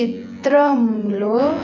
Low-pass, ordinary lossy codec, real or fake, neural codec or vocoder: 7.2 kHz; none; fake; vocoder, 24 kHz, 100 mel bands, Vocos